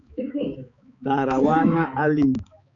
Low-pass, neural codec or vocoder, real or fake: 7.2 kHz; codec, 16 kHz, 4 kbps, X-Codec, HuBERT features, trained on balanced general audio; fake